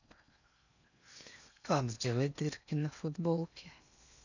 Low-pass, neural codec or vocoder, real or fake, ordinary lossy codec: 7.2 kHz; codec, 16 kHz in and 24 kHz out, 0.6 kbps, FocalCodec, streaming, 4096 codes; fake; none